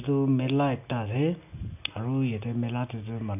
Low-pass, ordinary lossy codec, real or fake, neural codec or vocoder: 3.6 kHz; none; real; none